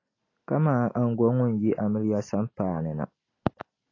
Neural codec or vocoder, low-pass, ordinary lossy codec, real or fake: none; 7.2 kHz; AAC, 32 kbps; real